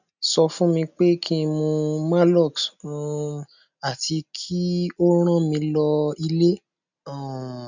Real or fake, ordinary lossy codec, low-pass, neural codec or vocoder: real; none; 7.2 kHz; none